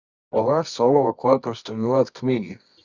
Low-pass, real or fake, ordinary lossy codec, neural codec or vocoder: 7.2 kHz; fake; Opus, 64 kbps; codec, 24 kHz, 0.9 kbps, WavTokenizer, medium music audio release